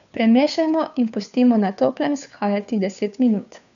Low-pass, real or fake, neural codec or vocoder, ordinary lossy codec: 7.2 kHz; fake; codec, 16 kHz, 2 kbps, FunCodec, trained on Chinese and English, 25 frames a second; none